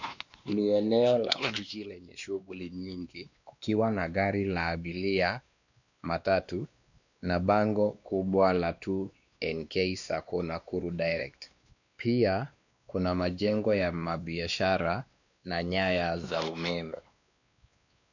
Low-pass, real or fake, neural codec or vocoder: 7.2 kHz; fake; codec, 16 kHz, 2 kbps, X-Codec, WavLM features, trained on Multilingual LibriSpeech